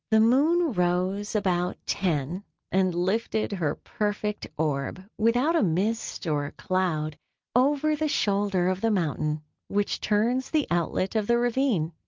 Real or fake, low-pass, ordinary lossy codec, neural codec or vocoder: real; 7.2 kHz; Opus, 24 kbps; none